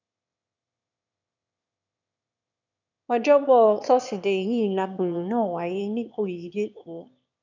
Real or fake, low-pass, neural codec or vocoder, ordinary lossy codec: fake; 7.2 kHz; autoencoder, 22.05 kHz, a latent of 192 numbers a frame, VITS, trained on one speaker; none